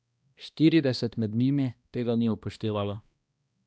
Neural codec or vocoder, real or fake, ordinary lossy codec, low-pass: codec, 16 kHz, 1 kbps, X-Codec, HuBERT features, trained on balanced general audio; fake; none; none